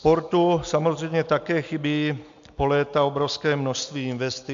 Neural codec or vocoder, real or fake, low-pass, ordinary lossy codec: none; real; 7.2 kHz; MP3, 64 kbps